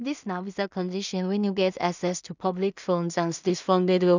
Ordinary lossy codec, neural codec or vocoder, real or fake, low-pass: none; codec, 16 kHz in and 24 kHz out, 0.4 kbps, LongCat-Audio-Codec, two codebook decoder; fake; 7.2 kHz